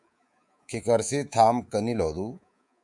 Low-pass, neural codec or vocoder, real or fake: 10.8 kHz; codec, 24 kHz, 3.1 kbps, DualCodec; fake